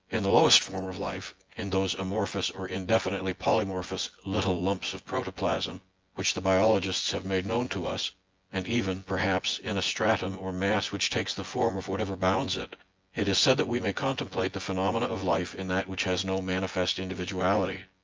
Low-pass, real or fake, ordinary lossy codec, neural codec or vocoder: 7.2 kHz; fake; Opus, 24 kbps; vocoder, 24 kHz, 100 mel bands, Vocos